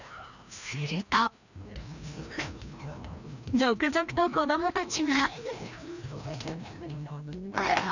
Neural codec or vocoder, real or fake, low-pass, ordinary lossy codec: codec, 16 kHz, 1 kbps, FreqCodec, larger model; fake; 7.2 kHz; none